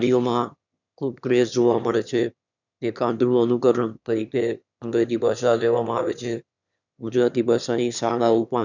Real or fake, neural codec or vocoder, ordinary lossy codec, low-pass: fake; autoencoder, 22.05 kHz, a latent of 192 numbers a frame, VITS, trained on one speaker; none; 7.2 kHz